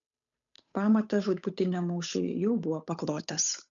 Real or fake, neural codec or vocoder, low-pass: fake; codec, 16 kHz, 8 kbps, FunCodec, trained on Chinese and English, 25 frames a second; 7.2 kHz